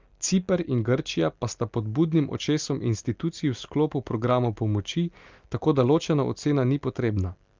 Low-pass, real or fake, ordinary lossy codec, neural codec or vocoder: 7.2 kHz; real; Opus, 32 kbps; none